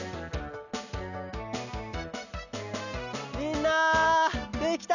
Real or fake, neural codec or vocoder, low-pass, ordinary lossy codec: real; none; 7.2 kHz; none